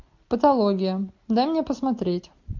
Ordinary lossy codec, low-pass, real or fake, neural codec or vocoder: MP3, 48 kbps; 7.2 kHz; real; none